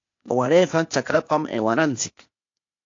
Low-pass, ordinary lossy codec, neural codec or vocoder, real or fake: 7.2 kHz; AAC, 48 kbps; codec, 16 kHz, 0.8 kbps, ZipCodec; fake